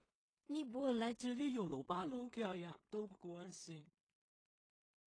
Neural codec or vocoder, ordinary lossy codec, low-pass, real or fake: codec, 16 kHz in and 24 kHz out, 0.4 kbps, LongCat-Audio-Codec, two codebook decoder; AAC, 32 kbps; 9.9 kHz; fake